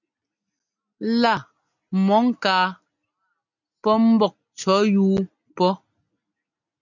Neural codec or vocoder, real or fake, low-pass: none; real; 7.2 kHz